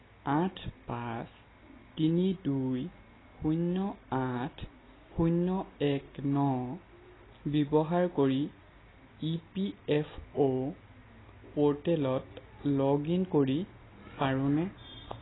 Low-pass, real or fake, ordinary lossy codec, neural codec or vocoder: 7.2 kHz; real; AAC, 16 kbps; none